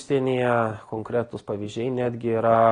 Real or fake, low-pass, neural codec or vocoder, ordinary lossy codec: real; 9.9 kHz; none; AAC, 32 kbps